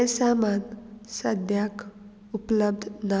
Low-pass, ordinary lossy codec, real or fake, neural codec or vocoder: none; none; real; none